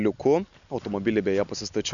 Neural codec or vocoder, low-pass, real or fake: none; 7.2 kHz; real